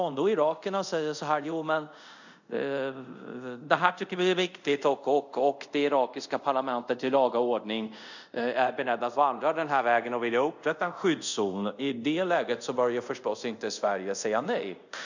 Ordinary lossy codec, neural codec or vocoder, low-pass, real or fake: none; codec, 24 kHz, 0.5 kbps, DualCodec; 7.2 kHz; fake